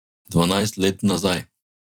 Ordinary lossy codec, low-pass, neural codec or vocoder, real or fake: none; 19.8 kHz; vocoder, 44.1 kHz, 128 mel bands every 256 samples, BigVGAN v2; fake